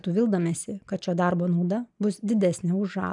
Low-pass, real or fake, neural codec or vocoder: 10.8 kHz; real; none